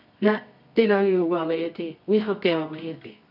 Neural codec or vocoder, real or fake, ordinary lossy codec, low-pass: codec, 24 kHz, 0.9 kbps, WavTokenizer, medium music audio release; fake; none; 5.4 kHz